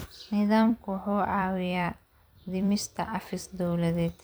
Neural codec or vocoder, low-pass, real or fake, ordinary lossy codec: vocoder, 44.1 kHz, 128 mel bands every 256 samples, BigVGAN v2; none; fake; none